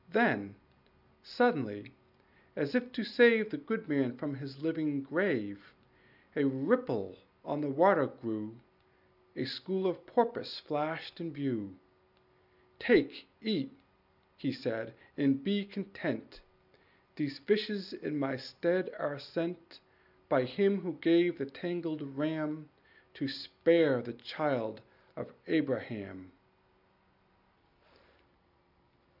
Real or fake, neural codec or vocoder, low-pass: real; none; 5.4 kHz